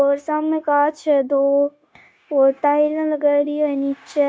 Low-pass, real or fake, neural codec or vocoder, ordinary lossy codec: none; fake; codec, 16 kHz, 0.9 kbps, LongCat-Audio-Codec; none